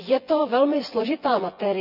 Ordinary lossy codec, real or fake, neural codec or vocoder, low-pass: none; fake; vocoder, 24 kHz, 100 mel bands, Vocos; 5.4 kHz